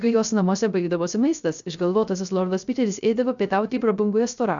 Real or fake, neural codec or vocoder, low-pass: fake; codec, 16 kHz, 0.3 kbps, FocalCodec; 7.2 kHz